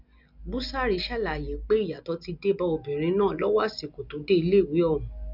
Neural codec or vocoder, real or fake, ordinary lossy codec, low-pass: none; real; none; 5.4 kHz